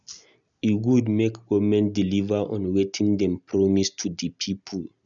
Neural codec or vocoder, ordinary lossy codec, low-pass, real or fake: none; none; 7.2 kHz; real